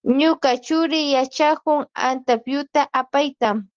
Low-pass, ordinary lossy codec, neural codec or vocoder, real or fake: 7.2 kHz; Opus, 16 kbps; none; real